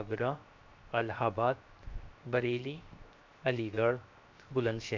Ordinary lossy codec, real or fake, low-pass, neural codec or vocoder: MP3, 64 kbps; fake; 7.2 kHz; codec, 16 kHz, 0.7 kbps, FocalCodec